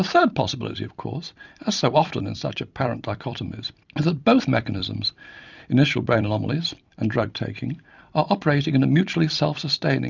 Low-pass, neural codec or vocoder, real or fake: 7.2 kHz; vocoder, 44.1 kHz, 128 mel bands every 256 samples, BigVGAN v2; fake